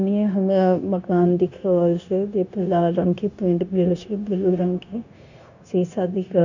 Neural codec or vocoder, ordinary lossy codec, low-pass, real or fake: codec, 16 kHz, 0.9 kbps, LongCat-Audio-Codec; none; 7.2 kHz; fake